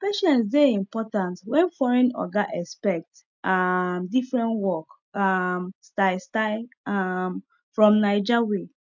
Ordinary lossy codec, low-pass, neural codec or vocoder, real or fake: none; 7.2 kHz; none; real